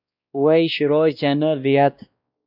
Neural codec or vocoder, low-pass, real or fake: codec, 16 kHz, 1 kbps, X-Codec, WavLM features, trained on Multilingual LibriSpeech; 5.4 kHz; fake